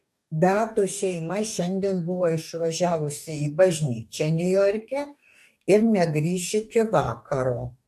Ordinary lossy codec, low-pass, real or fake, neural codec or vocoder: AAC, 96 kbps; 14.4 kHz; fake; codec, 44.1 kHz, 2.6 kbps, DAC